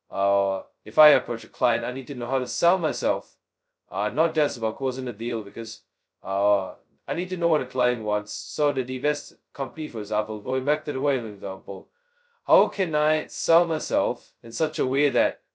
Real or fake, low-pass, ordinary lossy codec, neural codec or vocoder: fake; none; none; codec, 16 kHz, 0.2 kbps, FocalCodec